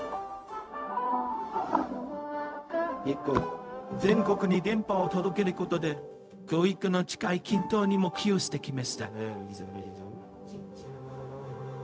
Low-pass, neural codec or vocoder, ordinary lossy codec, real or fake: none; codec, 16 kHz, 0.4 kbps, LongCat-Audio-Codec; none; fake